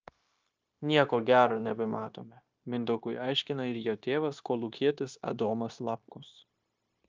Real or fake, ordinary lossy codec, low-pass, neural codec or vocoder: fake; Opus, 24 kbps; 7.2 kHz; codec, 16 kHz, 0.9 kbps, LongCat-Audio-Codec